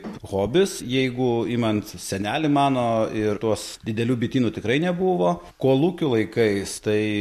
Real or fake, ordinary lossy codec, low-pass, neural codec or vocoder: real; MP3, 64 kbps; 14.4 kHz; none